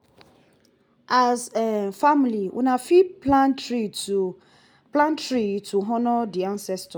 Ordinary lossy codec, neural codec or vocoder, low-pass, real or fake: none; none; none; real